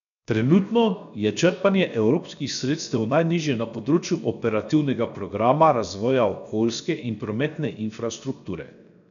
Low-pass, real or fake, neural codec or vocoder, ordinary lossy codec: 7.2 kHz; fake; codec, 16 kHz, 0.7 kbps, FocalCodec; none